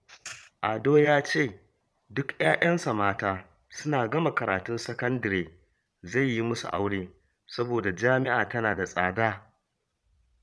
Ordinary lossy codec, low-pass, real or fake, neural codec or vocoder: none; none; fake; vocoder, 22.05 kHz, 80 mel bands, Vocos